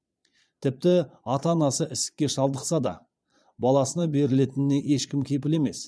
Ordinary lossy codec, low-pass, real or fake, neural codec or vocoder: none; none; fake; vocoder, 22.05 kHz, 80 mel bands, Vocos